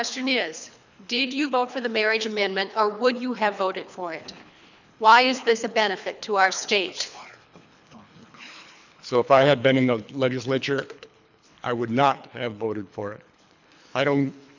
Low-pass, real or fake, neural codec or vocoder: 7.2 kHz; fake; codec, 24 kHz, 3 kbps, HILCodec